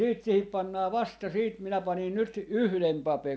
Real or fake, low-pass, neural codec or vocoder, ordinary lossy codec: real; none; none; none